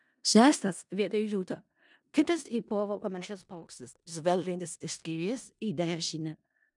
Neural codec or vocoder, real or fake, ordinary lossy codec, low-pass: codec, 16 kHz in and 24 kHz out, 0.4 kbps, LongCat-Audio-Codec, four codebook decoder; fake; MP3, 96 kbps; 10.8 kHz